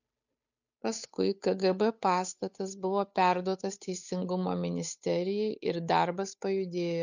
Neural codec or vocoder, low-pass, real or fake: codec, 16 kHz, 8 kbps, FunCodec, trained on Chinese and English, 25 frames a second; 7.2 kHz; fake